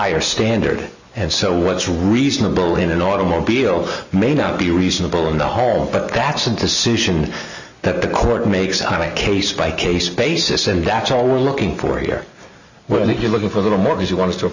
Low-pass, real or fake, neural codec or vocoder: 7.2 kHz; real; none